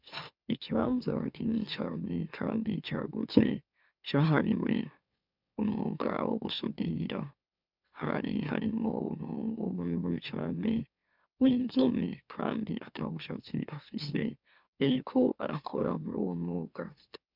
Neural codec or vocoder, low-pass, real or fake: autoencoder, 44.1 kHz, a latent of 192 numbers a frame, MeloTTS; 5.4 kHz; fake